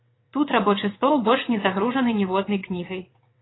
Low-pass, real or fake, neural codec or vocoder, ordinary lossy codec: 7.2 kHz; fake; vocoder, 22.05 kHz, 80 mel bands, WaveNeXt; AAC, 16 kbps